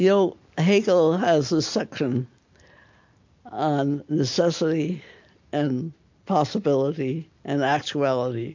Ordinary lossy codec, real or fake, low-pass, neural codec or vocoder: MP3, 48 kbps; real; 7.2 kHz; none